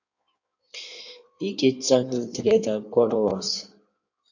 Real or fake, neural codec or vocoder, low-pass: fake; codec, 16 kHz in and 24 kHz out, 1.1 kbps, FireRedTTS-2 codec; 7.2 kHz